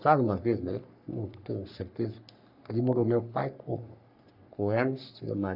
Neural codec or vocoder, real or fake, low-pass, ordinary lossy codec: codec, 44.1 kHz, 3.4 kbps, Pupu-Codec; fake; 5.4 kHz; none